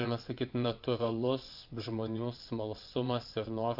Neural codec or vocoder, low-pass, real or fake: vocoder, 22.05 kHz, 80 mel bands, WaveNeXt; 5.4 kHz; fake